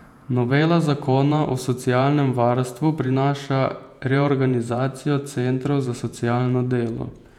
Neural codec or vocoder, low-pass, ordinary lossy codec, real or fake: vocoder, 48 kHz, 128 mel bands, Vocos; 19.8 kHz; none; fake